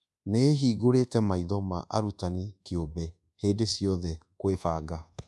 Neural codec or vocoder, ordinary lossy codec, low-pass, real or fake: codec, 24 kHz, 1.2 kbps, DualCodec; none; none; fake